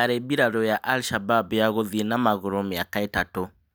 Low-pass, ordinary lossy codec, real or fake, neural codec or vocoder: none; none; real; none